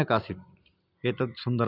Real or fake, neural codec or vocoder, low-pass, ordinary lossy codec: real; none; 5.4 kHz; none